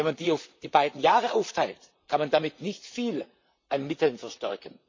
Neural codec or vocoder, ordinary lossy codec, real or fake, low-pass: vocoder, 44.1 kHz, 128 mel bands, Pupu-Vocoder; MP3, 64 kbps; fake; 7.2 kHz